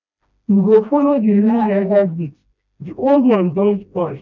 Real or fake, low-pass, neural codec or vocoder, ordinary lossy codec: fake; 7.2 kHz; codec, 16 kHz, 1 kbps, FreqCodec, smaller model; none